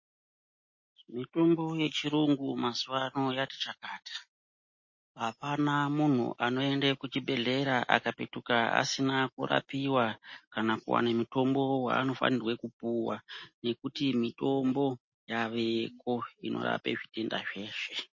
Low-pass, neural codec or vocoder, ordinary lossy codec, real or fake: 7.2 kHz; none; MP3, 32 kbps; real